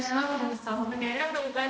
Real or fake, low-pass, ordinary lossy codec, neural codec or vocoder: fake; none; none; codec, 16 kHz, 1 kbps, X-Codec, HuBERT features, trained on balanced general audio